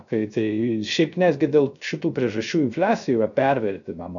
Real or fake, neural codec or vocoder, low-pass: fake; codec, 16 kHz, 0.3 kbps, FocalCodec; 7.2 kHz